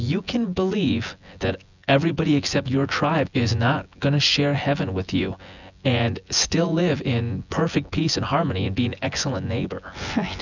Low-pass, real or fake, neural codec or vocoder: 7.2 kHz; fake; vocoder, 24 kHz, 100 mel bands, Vocos